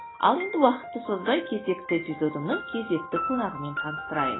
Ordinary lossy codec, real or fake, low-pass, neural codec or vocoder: AAC, 16 kbps; real; 7.2 kHz; none